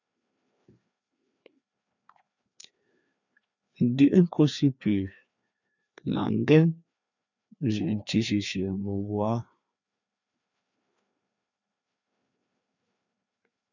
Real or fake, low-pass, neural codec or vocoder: fake; 7.2 kHz; codec, 16 kHz, 2 kbps, FreqCodec, larger model